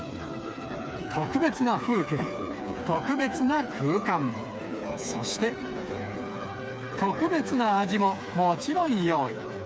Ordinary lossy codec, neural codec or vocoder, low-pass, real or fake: none; codec, 16 kHz, 4 kbps, FreqCodec, smaller model; none; fake